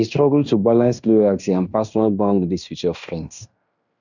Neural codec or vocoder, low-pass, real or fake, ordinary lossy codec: codec, 16 kHz in and 24 kHz out, 0.9 kbps, LongCat-Audio-Codec, fine tuned four codebook decoder; 7.2 kHz; fake; none